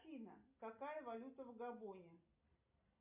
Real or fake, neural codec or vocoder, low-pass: real; none; 3.6 kHz